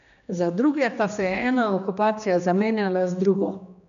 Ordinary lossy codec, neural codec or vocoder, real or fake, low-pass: none; codec, 16 kHz, 2 kbps, X-Codec, HuBERT features, trained on general audio; fake; 7.2 kHz